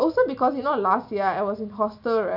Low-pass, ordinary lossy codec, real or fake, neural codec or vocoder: 5.4 kHz; none; real; none